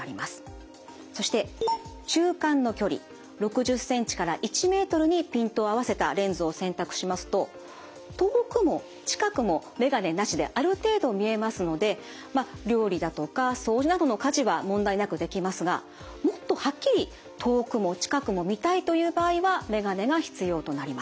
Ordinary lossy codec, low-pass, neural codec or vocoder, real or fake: none; none; none; real